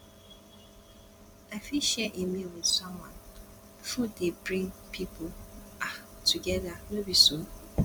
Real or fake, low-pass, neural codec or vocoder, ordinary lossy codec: fake; 19.8 kHz; vocoder, 44.1 kHz, 128 mel bands every 256 samples, BigVGAN v2; none